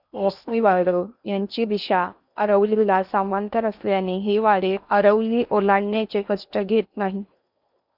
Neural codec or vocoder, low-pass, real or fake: codec, 16 kHz in and 24 kHz out, 0.8 kbps, FocalCodec, streaming, 65536 codes; 5.4 kHz; fake